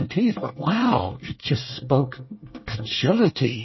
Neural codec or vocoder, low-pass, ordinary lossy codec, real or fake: codec, 24 kHz, 1 kbps, SNAC; 7.2 kHz; MP3, 24 kbps; fake